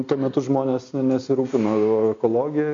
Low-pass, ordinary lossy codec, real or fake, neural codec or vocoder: 7.2 kHz; MP3, 48 kbps; real; none